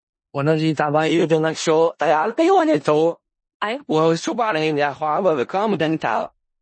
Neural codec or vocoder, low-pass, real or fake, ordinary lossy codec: codec, 16 kHz in and 24 kHz out, 0.4 kbps, LongCat-Audio-Codec, four codebook decoder; 9.9 kHz; fake; MP3, 32 kbps